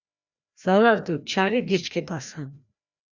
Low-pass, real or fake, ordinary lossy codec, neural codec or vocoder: 7.2 kHz; fake; Opus, 64 kbps; codec, 16 kHz, 1 kbps, FreqCodec, larger model